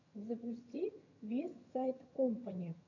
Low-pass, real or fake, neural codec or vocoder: 7.2 kHz; fake; vocoder, 22.05 kHz, 80 mel bands, HiFi-GAN